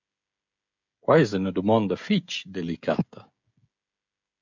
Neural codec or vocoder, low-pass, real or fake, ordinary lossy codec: codec, 16 kHz, 16 kbps, FreqCodec, smaller model; 7.2 kHz; fake; MP3, 64 kbps